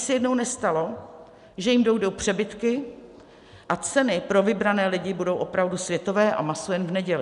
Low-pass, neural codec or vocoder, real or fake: 10.8 kHz; none; real